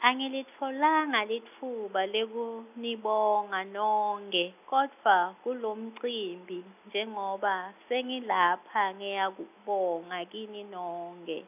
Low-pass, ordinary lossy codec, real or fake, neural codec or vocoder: 3.6 kHz; none; real; none